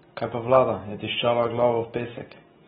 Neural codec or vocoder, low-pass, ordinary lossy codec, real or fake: none; 19.8 kHz; AAC, 16 kbps; real